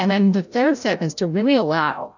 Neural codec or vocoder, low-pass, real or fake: codec, 16 kHz, 0.5 kbps, FreqCodec, larger model; 7.2 kHz; fake